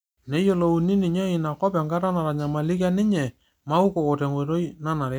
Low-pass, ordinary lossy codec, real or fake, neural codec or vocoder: none; none; real; none